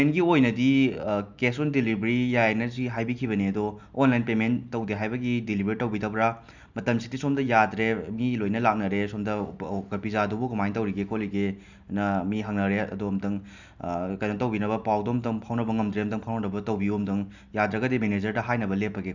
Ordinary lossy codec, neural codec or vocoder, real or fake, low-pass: none; none; real; 7.2 kHz